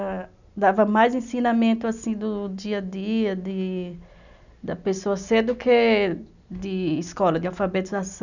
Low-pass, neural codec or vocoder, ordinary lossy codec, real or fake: 7.2 kHz; none; none; real